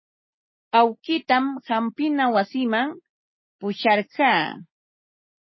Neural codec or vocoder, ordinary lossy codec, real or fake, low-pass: none; MP3, 24 kbps; real; 7.2 kHz